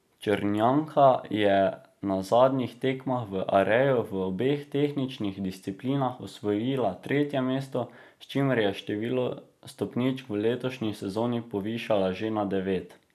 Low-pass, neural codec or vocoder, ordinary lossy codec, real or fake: 14.4 kHz; none; none; real